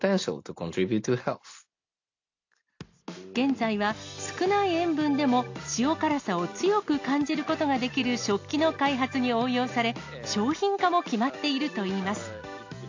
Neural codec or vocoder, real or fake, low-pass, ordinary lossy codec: none; real; 7.2 kHz; AAC, 48 kbps